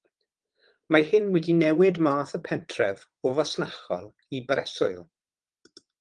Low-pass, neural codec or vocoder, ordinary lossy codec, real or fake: 9.9 kHz; vocoder, 22.05 kHz, 80 mel bands, WaveNeXt; Opus, 24 kbps; fake